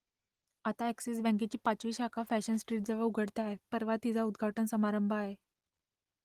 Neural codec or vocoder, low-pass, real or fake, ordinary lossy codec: none; 14.4 kHz; real; Opus, 24 kbps